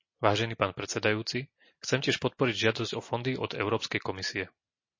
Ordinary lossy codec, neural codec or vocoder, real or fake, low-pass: MP3, 32 kbps; none; real; 7.2 kHz